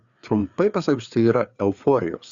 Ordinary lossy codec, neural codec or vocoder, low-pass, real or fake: Opus, 64 kbps; codec, 16 kHz, 4 kbps, FreqCodec, larger model; 7.2 kHz; fake